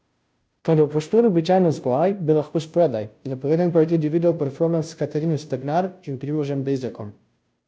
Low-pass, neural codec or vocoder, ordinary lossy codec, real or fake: none; codec, 16 kHz, 0.5 kbps, FunCodec, trained on Chinese and English, 25 frames a second; none; fake